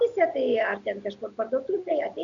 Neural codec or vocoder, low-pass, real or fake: none; 7.2 kHz; real